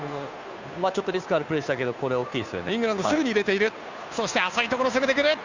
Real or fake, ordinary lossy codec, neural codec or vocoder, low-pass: fake; Opus, 64 kbps; codec, 16 kHz, 2 kbps, FunCodec, trained on Chinese and English, 25 frames a second; 7.2 kHz